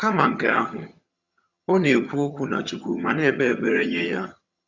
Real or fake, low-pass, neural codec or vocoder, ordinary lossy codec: fake; 7.2 kHz; vocoder, 22.05 kHz, 80 mel bands, HiFi-GAN; Opus, 64 kbps